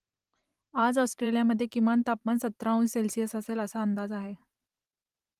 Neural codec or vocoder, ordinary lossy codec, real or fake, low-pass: vocoder, 44.1 kHz, 128 mel bands every 512 samples, BigVGAN v2; Opus, 24 kbps; fake; 14.4 kHz